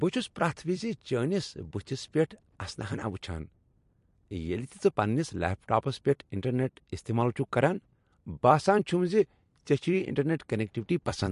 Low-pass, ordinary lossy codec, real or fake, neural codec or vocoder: 14.4 kHz; MP3, 48 kbps; fake; vocoder, 44.1 kHz, 128 mel bands every 256 samples, BigVGAN v2